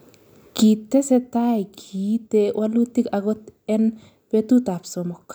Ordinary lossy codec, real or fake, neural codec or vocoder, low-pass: none; real; none; none